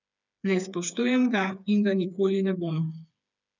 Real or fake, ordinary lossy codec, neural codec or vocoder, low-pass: fake; none; codec, 16 kHz, 4 kbps, FreqCodec, smaller model; 7.2 kHz